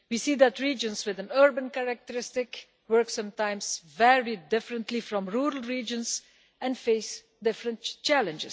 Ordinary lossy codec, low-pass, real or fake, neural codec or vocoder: none; none; real; none